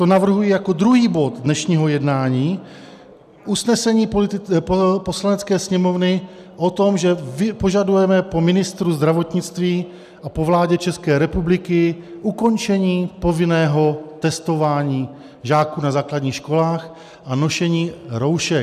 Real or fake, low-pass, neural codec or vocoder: real; 14.4 kHz; none